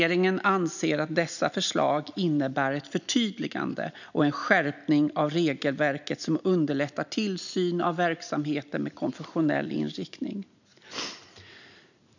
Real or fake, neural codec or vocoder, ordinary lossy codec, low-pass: real; none; none; 7.2 kHz